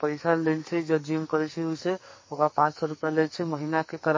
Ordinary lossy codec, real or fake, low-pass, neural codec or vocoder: MP3, 32 kbps; fake; 7.2 kHz; codec, 44.1 kHz, 2.6 kbps, SNAC